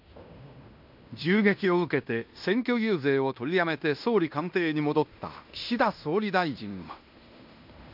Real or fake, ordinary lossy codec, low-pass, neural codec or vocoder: fake; MP3, 48 kbps; 5.4 kHz; codec, 16 kHz in and 24 kHz out, 0.9 kbps, LongCat-Audio-Codec, fine tuned four codebook decoder